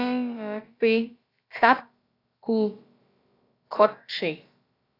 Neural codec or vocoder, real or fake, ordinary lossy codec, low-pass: codec, 16 kHz, about 1 kbps, DyCAST, with the encoder's durations; fake; AAC, 32 kbps; 5.4 kHz